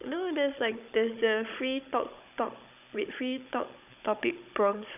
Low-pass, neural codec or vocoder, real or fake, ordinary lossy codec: 3.6 kHz; codec, 16 kHz, 16 kbps, FunCodec, trained on Chinese and English, 50 frames a second; fake; none